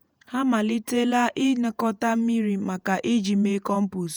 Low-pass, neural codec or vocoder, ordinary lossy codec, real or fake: none; vocoder, 48 kHz, 128 mel bands, Vocos; none; fake